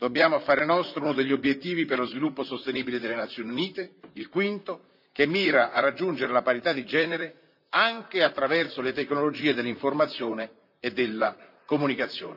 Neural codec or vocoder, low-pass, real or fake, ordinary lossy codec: vocoder, 44.1 kHz, 128 mel bands, Pupu-Vocoder; 5.4 kHz; fake; none